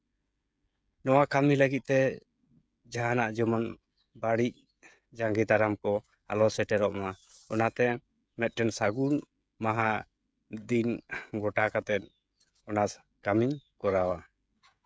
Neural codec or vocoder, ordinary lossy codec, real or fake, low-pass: codec, 16 kHz, 16 kbps, FreqCodec, smaller model; none; fake; none